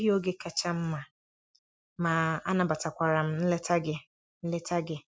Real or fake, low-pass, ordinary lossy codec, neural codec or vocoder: real; none; none; none